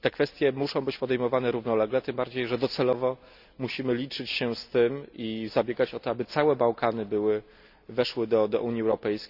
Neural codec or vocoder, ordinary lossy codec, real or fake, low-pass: none; none; real; 5.4 kHz